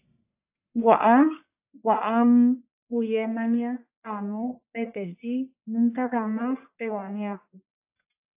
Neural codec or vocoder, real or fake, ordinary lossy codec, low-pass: codec, 44.1 kHz, 1.7 kbps, Pupu-Codec; fake; AAC, 32 kbps; 3.6 kHz